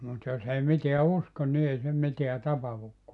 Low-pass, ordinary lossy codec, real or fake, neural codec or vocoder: 10.8 kHz; none; real; none